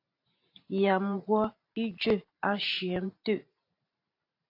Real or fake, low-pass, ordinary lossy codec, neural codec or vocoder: fake; 5.4 kHz; AAC, 32 kbps; vocoder, 44.1 kHz, 128 mel bands every 512 samples, BigVGAN v2